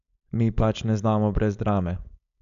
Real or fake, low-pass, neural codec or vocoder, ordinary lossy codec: fake; 7.2 kHz; codec, 16 kHz, 4.8 kbps, FACodec; none